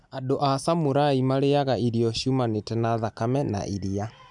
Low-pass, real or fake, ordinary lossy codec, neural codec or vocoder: 10.8 kHz; real; none; none